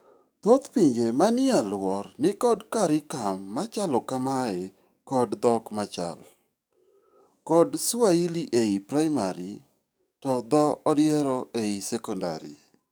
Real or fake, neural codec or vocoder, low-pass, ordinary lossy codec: fake; codec, 44.1 kHz, 7.8 kbps, DAC; none; none